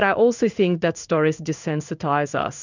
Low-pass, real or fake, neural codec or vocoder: 7.2 kHz; fake; codec, 16 kHz in and 24 kHz out, 1 kbps, XY-Tokenizer